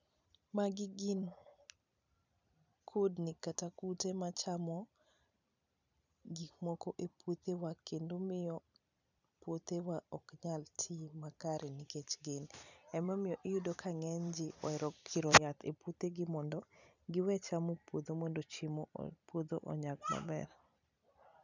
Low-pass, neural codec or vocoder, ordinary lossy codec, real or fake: 7.2 kHz; vocoder, 44.1 kHz, 128 mel bands every 256 samples, BigVGAN v2; none; fake